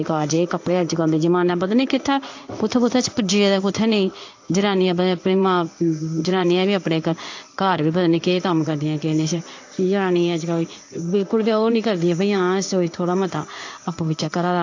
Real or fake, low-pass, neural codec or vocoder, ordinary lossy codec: fake; 7.2 kHz; codec, 16 kHz in and 24 kHz out, 1 kbps, XY-Tokenizer; AAC, 48 kbps